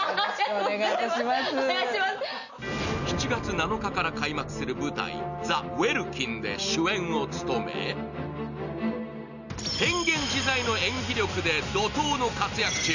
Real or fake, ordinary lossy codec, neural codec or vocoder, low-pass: real; none; none; 7.2 kHz